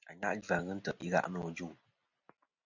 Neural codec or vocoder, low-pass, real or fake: none; 7.2 kHz; real